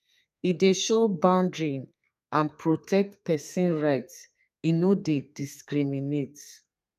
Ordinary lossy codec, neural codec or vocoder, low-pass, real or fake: none; codec, 32 kHz, 1.9 kbps, SNAC; 14.4 kHz; fake